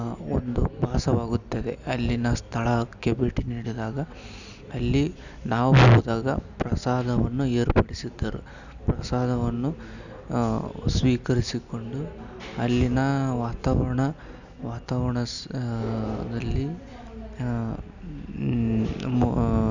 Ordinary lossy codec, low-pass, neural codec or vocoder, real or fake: none; 7.2 kHz; none; real